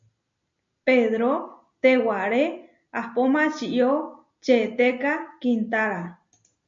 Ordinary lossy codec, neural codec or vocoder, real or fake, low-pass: MP3, 48 kbps; none; real; 7.2 kHz